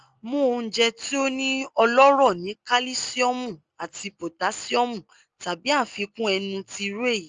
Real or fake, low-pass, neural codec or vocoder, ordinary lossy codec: real; 7.2 kHz; none; Opus, 24 kbps